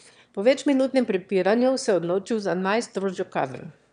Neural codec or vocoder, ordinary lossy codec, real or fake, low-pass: autoencoder, 22.05 kHz, a latent of 192 numbers a frame, VITS, trained on one speaker; none; fake; 9.9 kHz